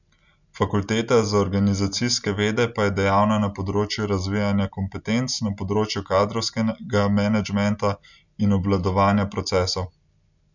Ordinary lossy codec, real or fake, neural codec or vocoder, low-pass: none; real; none; 7.2 kHz